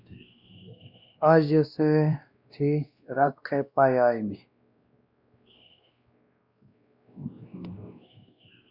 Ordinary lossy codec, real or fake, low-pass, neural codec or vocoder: Opus, 64 kbps; fake; 5.4 kHz; codec, 16 kHz, 1 kbps, X-Codec, WavLM features, trained on Multilingual LibriSpeech